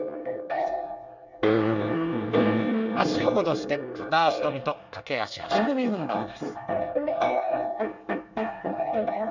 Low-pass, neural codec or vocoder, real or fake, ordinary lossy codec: 7.2 kHz; codec, 24 kHz, 1 kbps, SNAC; fake; none